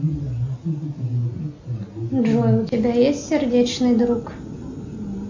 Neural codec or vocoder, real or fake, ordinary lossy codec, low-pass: none; real; MP3, 48 kbps; 7.2 kHz